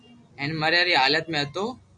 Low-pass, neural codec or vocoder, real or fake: 10.8 kHz; none; real